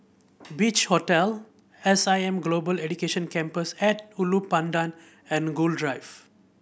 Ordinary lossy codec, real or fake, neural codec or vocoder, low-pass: none; real; none; none